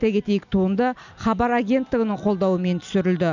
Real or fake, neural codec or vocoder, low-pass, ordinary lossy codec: real; none; 7.2 kHz; none